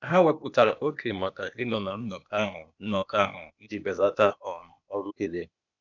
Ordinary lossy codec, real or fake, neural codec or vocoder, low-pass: none; fake; codec, 16 kHz, 0.8 kbps, ZipCodec; 7.2 kHz